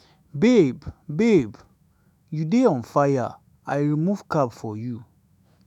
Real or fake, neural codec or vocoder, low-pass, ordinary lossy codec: fake; autoencoder, 48 kHz, 128 numbers a frame, DAC-VAE, trained on Japanese speech; 19.8 kHz; none